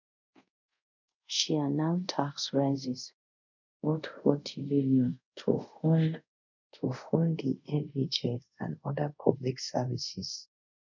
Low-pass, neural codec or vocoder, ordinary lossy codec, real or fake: 7.2 kHz; codec, 24 kHz, 0.5 kbps, DualCodec; none; fake